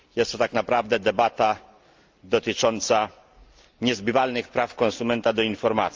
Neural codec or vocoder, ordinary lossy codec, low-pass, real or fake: none; Opus, 32 kbps; 7.2 kHz; real